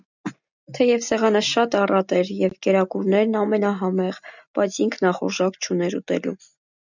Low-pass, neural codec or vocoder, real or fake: 7.2 kHz; none; real